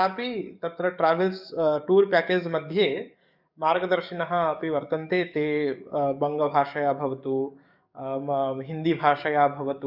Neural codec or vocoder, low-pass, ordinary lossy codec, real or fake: codec, 44.1 kHz, 7.8 kbps, DAC; 5.4 kHz; none; fake